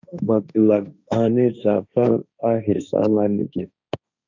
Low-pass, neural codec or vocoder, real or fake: 7.2 kHz; codec, 16 kHz, 1.1 kbps, Voila-Tokenizer; fake